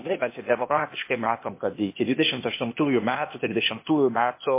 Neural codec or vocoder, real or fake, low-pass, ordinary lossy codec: codec, 16 kHz, 0.8 kbps, ZipCodec; fake; 3.6 kHz; MP3, 24 kbps